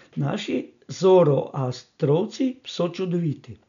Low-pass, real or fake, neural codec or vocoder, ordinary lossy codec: 7.2 kHz; real; none; none